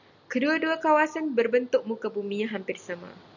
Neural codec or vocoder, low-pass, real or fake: none; 7.2 kHz; real